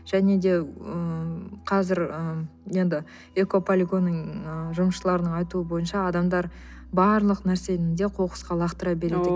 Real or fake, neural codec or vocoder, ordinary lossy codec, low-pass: real; none; none; none